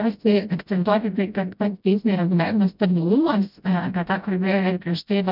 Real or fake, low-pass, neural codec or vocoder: fake; 5.4 kHz; codec, 16 kHz, 0.5 kbps, FreqCodec, smaller model